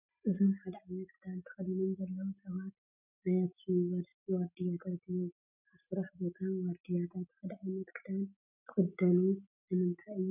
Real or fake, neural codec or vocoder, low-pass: real; none; 3.6 kHz